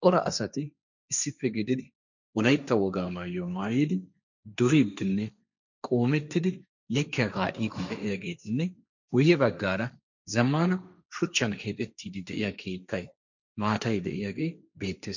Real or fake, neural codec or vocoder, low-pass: fake; codec, 16 kHz, 1.1 kbps, Voila-Tokenizer; 7.2 kHz